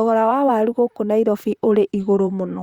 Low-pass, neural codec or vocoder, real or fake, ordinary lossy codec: 19.8 kHz; vocoder, 44.1 kHz, 128 mel bands, Pupu-Vocoder; fake; Opus, 64 kbps